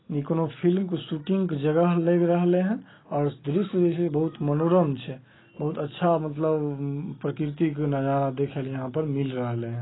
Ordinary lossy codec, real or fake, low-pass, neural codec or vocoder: AAC, 16 kbps; real; 7.2 kHz; none